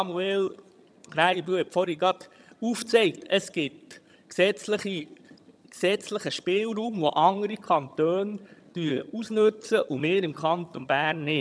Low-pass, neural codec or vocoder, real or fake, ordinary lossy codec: none; vocoder, 22.05 kHz, 80 mel bands, HiFi-GAN; fake; none